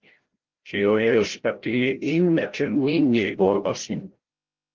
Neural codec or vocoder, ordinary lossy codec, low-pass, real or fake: codec, 16 kHz, 0.5 kbps, FreqCodec, larger model; Opus, 16 kbps; 7.2 kHz; fake